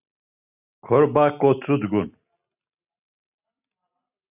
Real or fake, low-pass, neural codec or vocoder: real; 3.6 kHz; none